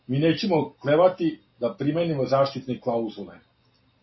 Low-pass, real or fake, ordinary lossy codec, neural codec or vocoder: 7.2 kHz; real; MP3, 24 kbps; none